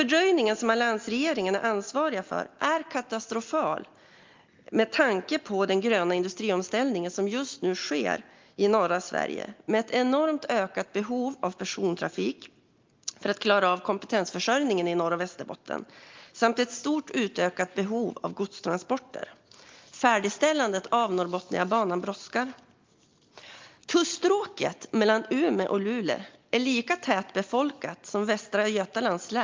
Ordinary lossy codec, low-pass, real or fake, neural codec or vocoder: Opus, 32 kbps; 7.2 kHz; real; none